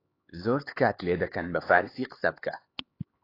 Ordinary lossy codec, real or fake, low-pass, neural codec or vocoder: AAC, 24 kbps; fake; 5.4 kHz; codec, 16 kHz, 4 kbps, X-Codec, HuBERT features, trained on LibriSpeech